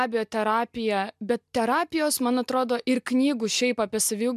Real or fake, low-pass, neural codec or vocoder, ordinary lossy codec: real; 14.4 kHz; none; MP3, 96 kbps